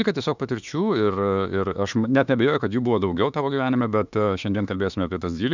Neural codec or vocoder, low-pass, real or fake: autoencoder, 48 kHz, 32 numbers a frame, DAC-VAE, trained on Japanese speech; 7.2 kHz; fake